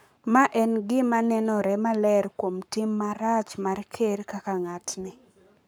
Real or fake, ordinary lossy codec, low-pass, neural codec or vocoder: fake; none; none; codec, 44.1 kHz, 7.8 kbps, Pupu-Codec